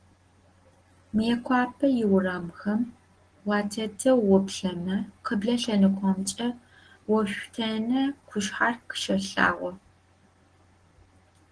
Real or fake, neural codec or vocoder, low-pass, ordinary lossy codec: real; none; 9.9 kHz; Opus, 16 kbps